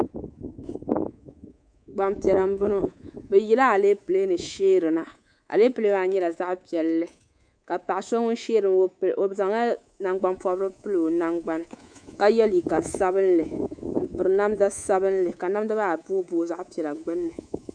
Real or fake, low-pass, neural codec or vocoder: fake; 9.9 kHz; codec, 24 kHz, 3.1 kbps, DualCodec